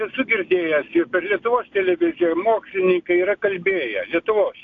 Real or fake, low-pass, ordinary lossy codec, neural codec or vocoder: real; 7.2 kHz; AAC, 48 kbps; none